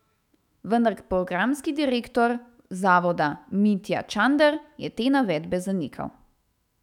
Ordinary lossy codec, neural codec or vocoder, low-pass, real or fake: none; autoencoder, 48 kHz, 128 numbers a frame, DAC-VAE, trained on Japanese speech; 19.8 kHz; fake